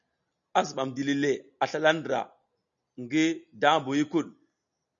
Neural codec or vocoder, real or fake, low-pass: none; real; 7.2 kHz